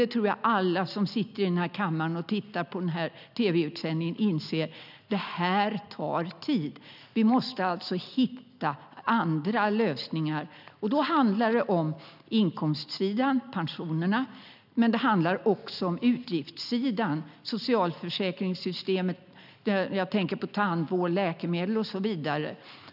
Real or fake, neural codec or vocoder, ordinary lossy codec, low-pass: real; none; none; 5.4 kHz